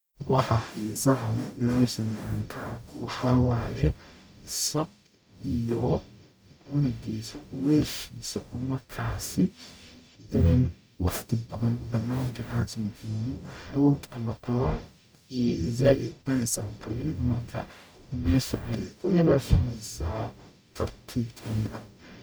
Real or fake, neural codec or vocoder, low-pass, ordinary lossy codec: fake; codec, 44.1 kHz, 0.9 kbps, DAC; none; none